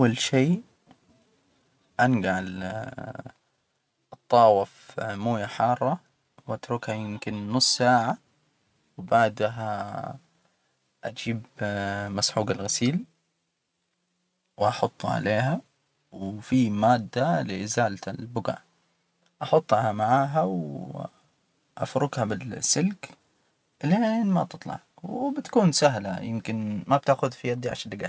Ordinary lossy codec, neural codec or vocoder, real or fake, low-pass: none; none; real; none